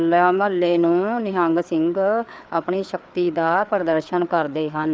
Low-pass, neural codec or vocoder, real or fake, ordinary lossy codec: none; codec, 16 kHz, 4 kbps, FreqCodec, larger model; fake; none